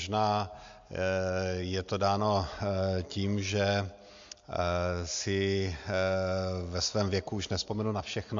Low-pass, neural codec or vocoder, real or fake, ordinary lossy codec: 7.2 kHz; none; real; MP3, 48 kbps